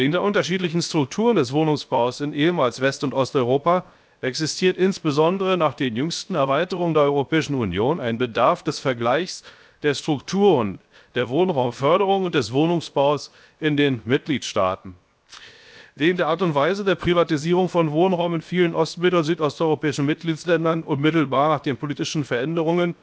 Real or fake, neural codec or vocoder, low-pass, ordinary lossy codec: fake; codec, 16 kHz, 0.7 kbps, FocalCodec; none; none